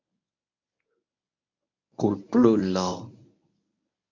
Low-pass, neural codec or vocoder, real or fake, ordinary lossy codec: 7.2 kHz; codec, 24 kHz, 0.9 kbps, WavTokenizer, medium speech release version 1; fake; MP3, 48 kbps